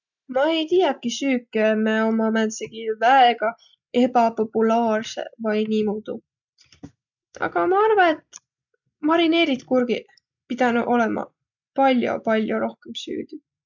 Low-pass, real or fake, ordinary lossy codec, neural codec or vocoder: 7.2 kHz; real; none; none